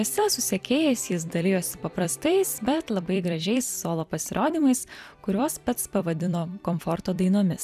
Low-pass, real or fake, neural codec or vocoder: 14.4 kHz; fake; vocoder, 44.1 kHz, 128 mel bands every 256 samples, BigVGAN v2